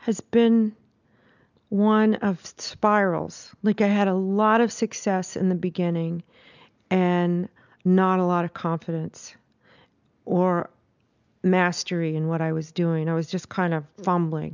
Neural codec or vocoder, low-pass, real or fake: none; 7.2 kHz; real